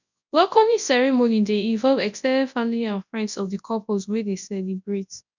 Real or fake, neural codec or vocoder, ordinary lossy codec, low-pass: fake; codec, 24 kHz, 0.9 kbps, WavTokenizer, large speech release; none; 7.2 kHz